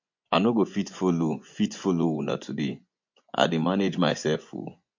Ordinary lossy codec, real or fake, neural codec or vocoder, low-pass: MP3, 48 kbps; fake; vocoder, 24 kHz, 100 mel bands, Vocos; 7.2 kHz